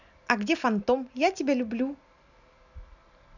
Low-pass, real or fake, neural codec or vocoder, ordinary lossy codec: 7.2 kHz; real; none; none